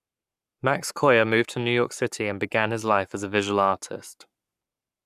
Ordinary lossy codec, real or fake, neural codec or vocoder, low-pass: none; fake; codec, 44.1 kHz, 7.8 kbps, Pupu-Codec; 14.4 kHz